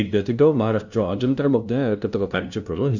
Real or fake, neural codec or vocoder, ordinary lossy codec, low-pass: fake; codec, 16 kHz, 0.5 kbps, FunCodec, trained on LibriTTS, 25 frames a second; none; 7.2 kHz